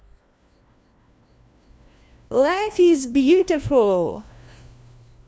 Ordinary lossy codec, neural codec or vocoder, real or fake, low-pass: none; codec, 16 kHz, 1 kbps, FunCodec, trained on LibriTTS, 50 frames a second; fake; none